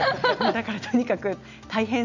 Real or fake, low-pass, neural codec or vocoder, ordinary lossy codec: real; 7.2 kHz; none; none